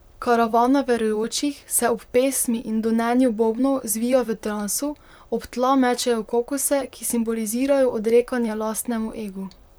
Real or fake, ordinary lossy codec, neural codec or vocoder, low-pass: fake; none; vocoder, 44.1 kHz, 128 mel bands, Pupu-Vocoder; none